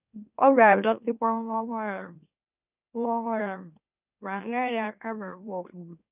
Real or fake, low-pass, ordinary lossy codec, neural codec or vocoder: fake; 3.6 kHz; none; autoencoder, 44.1 kHz, a latent of 192 numbers a frame, MeloTTS